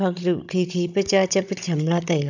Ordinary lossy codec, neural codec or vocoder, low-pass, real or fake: none; codec, 16 kHz, 16 kbps, FunCodec, trained on Chinese and English, 50 frames a second; 7.2 kHz; fake